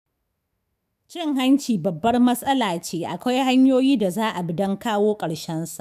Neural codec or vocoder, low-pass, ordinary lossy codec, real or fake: autoencoder, 48 kHz, 128 numbers a frame, DAC-VAE, trained on Japanese speech; 14.4 kHz; none; fake